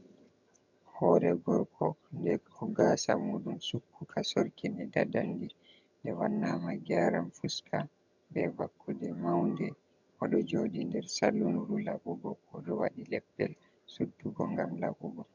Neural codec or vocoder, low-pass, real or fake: vocoder, 22.05 kHz, 80 mel bands, HiFi-GAN; 7.2 kHz; fake